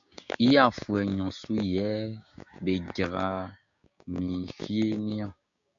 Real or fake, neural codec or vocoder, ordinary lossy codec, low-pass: fake; codec, 16 kHz, 6 kbps, DAC; AAC, 64 kbps; 7.2 kHz